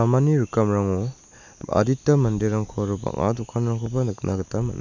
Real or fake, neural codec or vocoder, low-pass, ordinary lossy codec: real; none; 7.2 kHz; none